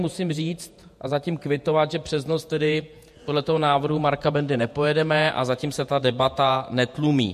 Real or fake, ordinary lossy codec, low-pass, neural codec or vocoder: fake; MP3, 64 kbps; 14.4 kHz; vocoder, 48 kHz, 128 mel bands, Vocos